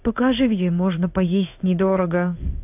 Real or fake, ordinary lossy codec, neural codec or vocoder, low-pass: fake; AAC, 32 kbps; codec, 16 kHz in and 24 kHz out, 1 kbps, XY-Tokenizer; 3.6 kHz